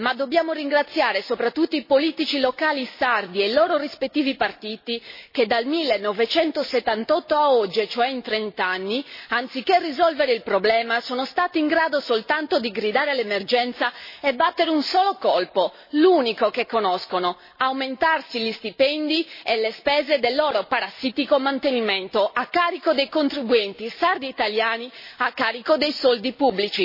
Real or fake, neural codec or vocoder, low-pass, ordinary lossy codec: real; none; 5.4 kHz; MP3, 24 kbps